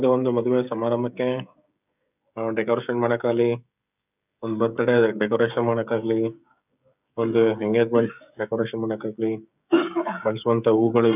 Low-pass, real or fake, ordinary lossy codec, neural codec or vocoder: 3.6 kHz; fake; none; codec, 16 kHz, 16 kbps, FreqCodec, smaller model